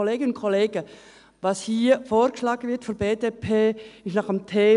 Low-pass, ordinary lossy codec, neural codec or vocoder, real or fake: 10.8 kHz; none; none; real